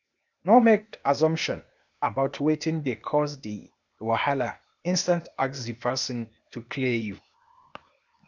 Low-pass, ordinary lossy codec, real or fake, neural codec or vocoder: 7.2 kHz; none; fake; codec, 16 kHz, 0.8 kbps, ZipCodec